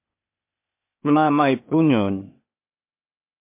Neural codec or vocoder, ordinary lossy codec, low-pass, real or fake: codec, 16 kHz, 0.8 kbps, ZipCodec; MP3, 32 kbps; 3.6 kHz; fake